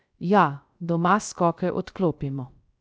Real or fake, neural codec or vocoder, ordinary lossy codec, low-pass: fake; codec, 16 kHz, 0.3 kbps, FocalCodec; none; none